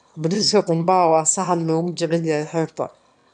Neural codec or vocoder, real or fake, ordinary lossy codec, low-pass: autoencoder, 22.05 kHz, a latent of 192 numbers a frame, VITS, trained on one speaker; fake; none; 9.9 kHz